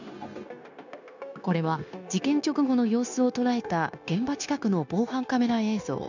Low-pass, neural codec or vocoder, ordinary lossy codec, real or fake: 7.2 kHz; codec, 16 kHz, 0.9 kbps, LongCat-Audio-Codec; none; fake